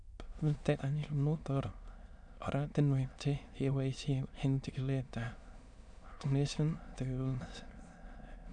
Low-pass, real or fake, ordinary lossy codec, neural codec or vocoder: 9.9 kHz; fake; none; autoencoder, 22.05 kHz, a latent of 192 numbers a frame, VITS, trained on many speakers